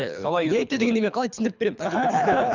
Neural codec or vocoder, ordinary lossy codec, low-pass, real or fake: codec, 24 kHz, 3 kbps, HILCodec; none; 7.2 kHz; fake